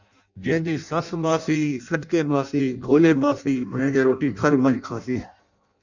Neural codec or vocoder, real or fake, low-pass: codec, 16 kHz in and 24 kHz out, 0.6 kbps, FireRedTTS-2 codec; fake; 7.2 kHz